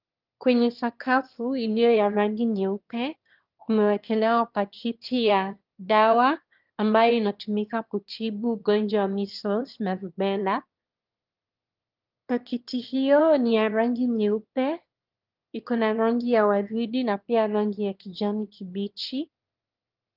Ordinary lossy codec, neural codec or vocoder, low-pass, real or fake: Opus, 32 kbps; autoencoder, 22.05 kHz, a latent of 192 numbers a frame, VITS, trained on one speaker; 5.4 kHz; fake